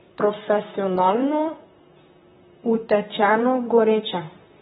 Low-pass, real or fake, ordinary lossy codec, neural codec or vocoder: 19.8 kHz; fake; AAC, 16 kbps; vocoder, 44.1 kHz, 128 mel bands, Pupu-Vocoder